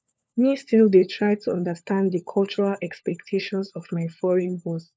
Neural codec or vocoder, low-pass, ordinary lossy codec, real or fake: codec, 16 kHz, 4 kbps, FunCodec, trained on LibriTTS, 50 frames a second; none; none; fake